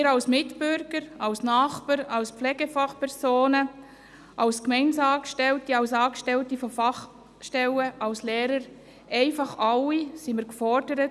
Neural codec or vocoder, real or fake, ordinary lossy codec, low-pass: none; real; none; none